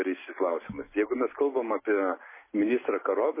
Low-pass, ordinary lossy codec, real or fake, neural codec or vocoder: 3.6 kHz; MP3, 16 kbps; real; none